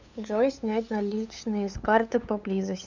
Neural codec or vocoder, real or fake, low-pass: codec, 16 kHz, 8 kbps, FunCodec, trained on LibriTTS, 25 frames a second; fake; 7.2 kHz